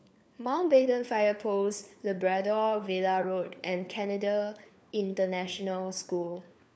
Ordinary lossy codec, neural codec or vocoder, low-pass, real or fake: none; codec, 16 kHz, 4 kbps, FunCodec, trained on LibriTTS, 50 frames a second; none; fake